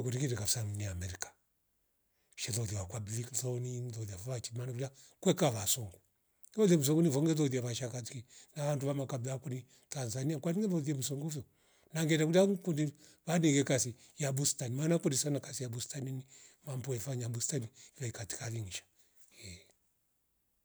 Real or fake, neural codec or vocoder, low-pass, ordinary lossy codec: real; none; none; none